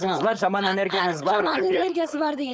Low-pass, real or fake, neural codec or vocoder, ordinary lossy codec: none; fake; codec, 16 kHz, 4.8 kbps, FACodec; none